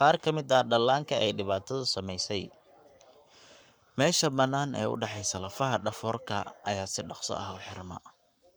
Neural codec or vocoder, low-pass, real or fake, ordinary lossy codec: codec, 44.1 kHz, 7.8 kbps, Pupu-Codec; none; fake; none